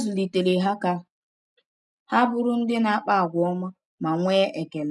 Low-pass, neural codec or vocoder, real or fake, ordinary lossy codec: none; none; real; none